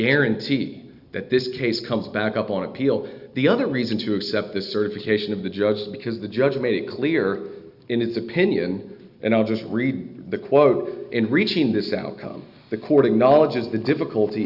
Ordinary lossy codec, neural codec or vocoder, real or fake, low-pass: Opus, 64 kbps; none; real; 5.4 kHz